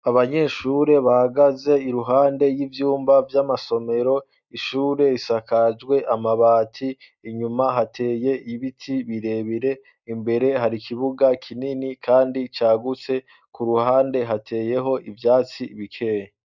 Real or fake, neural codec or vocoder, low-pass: real; none; 7.2 kHz